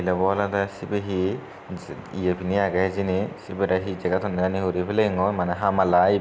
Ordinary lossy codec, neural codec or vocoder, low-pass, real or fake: none; none; none; real